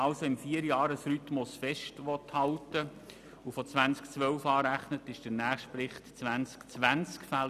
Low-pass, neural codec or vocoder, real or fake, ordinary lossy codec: 14.4 kHz; none; real; none